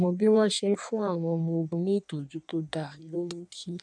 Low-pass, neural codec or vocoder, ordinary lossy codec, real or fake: 9.9 kHz; codec, 16 kHz in and 24 kHz out, 1.1 kbps, FireRedTTS-2 codec; none; fake